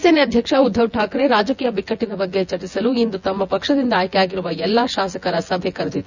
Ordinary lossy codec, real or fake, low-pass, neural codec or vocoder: none; fake; 7.2 kHz; vocoder, 24 kHz, 100 mel bands, Vocos